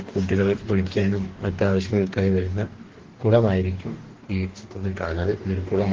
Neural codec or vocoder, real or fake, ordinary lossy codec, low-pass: codec, 44.1 kHz, 2.6 kbps, DAC; fake; Opus, 16 kbps; 7.2 kHz